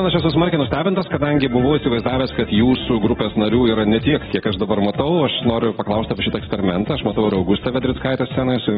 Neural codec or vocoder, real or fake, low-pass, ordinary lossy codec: none; real; 19.8 kHz; AAC, 16 kbps